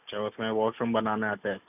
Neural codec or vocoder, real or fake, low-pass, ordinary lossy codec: none; real; 3.6 kHz; none